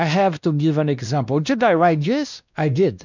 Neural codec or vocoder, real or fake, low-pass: codec, 16 kHz, 1 kbps, X-Codec, WavLM features, trained on Multilingual LibriSpeech; fake; 7.2 kHz